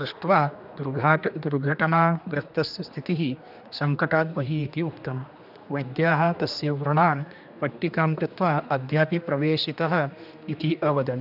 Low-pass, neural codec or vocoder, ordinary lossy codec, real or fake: 5.4 kHz; codec, 16 kHz, 2 kbps, X-Codec, HuBERT features, trained on general audio; none; fake